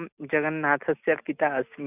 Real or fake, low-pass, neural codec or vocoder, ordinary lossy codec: real; 3.6 kHz; none; none